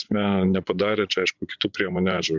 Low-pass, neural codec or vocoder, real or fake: 7.2 kHz; vocoder, 24 kHz, 100 mel bands, Vocos; fake